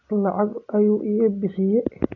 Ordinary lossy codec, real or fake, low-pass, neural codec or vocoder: MP3, 64 kbps; real; 7.2 kHz; none